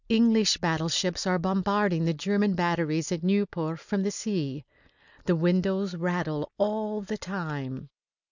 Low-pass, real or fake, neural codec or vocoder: 7.2 kHz; real; none